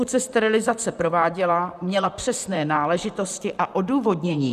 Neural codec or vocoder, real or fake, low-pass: vocoder, 44.1 kHz, 128 mel bands, Pupu-Vocoder; fake; 14.4 kHz